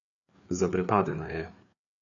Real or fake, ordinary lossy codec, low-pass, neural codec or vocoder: fake; none; 7.2 kHz; codec, 16 kHz, 4 kbps, FreqCodec, larger model